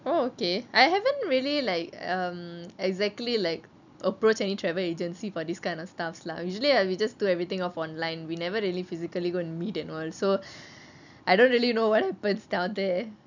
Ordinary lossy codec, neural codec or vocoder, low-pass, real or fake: none; none; 7.2 kHz; real